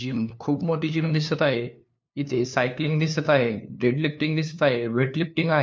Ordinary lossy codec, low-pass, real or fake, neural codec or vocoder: none; none; fake; codec, 16 kHz, 2 kbps, FunCodec, trained on LibriTTS, 25 frames a second